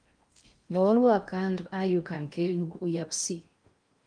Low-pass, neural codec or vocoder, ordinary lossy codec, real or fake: 9.9 kHz; codec, 16 kHz in and 24 kHz out, 0.6 kbps, FocalCodec, streaming, 4096 codes; Opus, 32 kbps; fake